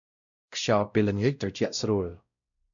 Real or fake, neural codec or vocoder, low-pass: fake; codec, 16 kHz, 0.5 kbps, X-Codec, WavLM features, trained on Multilingual LibriSpeech; 7.2 kHz